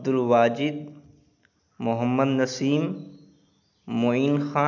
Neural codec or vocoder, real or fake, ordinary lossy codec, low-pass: none; real; none; 7.2 kHz